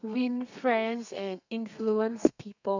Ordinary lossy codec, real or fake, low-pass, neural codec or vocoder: none; fake; 7.2 kHz; codec, 32 kHz, 1.9 kbps, SNAC